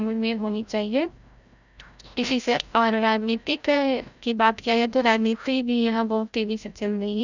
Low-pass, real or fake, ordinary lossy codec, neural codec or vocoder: 7.2 kHz; fake; none; codec, 16 kHz, 0.5 kbps, FreqCodec, larger model